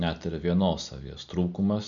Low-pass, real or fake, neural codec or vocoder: 7.2 kHz; real; none